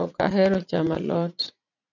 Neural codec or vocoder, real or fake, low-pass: vocoder, 44.1 kHz, 80 mel bands, Vocos; fake; 7.2 kHz